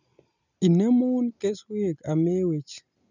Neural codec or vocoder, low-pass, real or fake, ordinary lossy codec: none; 7.2 kHz; real; none